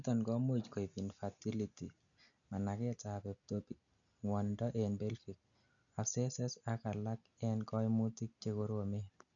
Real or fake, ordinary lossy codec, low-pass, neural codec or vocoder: real; none; 7.2 kHz; none